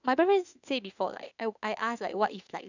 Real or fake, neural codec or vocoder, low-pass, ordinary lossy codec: fake; autoencoder, 48 kHz, 32 numbers a frame, DAC-VAE, trained on Japanese speech; 7.2 kHz; none